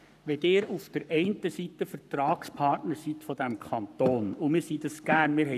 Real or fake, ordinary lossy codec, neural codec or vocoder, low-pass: fake; none; codec, 44.1 kHz, 7.8 kbps, Pupu-Codec; 14.4 kHz